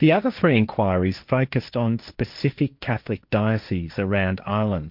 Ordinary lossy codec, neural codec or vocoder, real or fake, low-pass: MP3, 48 kbps; codec, 16 kHz, 1.1 kbps, Voila-Tokenizer; fake; 5.4 kHz